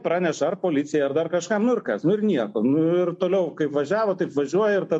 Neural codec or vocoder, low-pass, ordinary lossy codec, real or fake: none; 10.8 kHz; MP3, 48 kbps; real